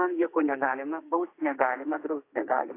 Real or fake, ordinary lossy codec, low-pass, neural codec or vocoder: fake; AAC, 24 kbps; 3.6 kHz; codec, 44.1 kHz, 2.6 kbps, SNAC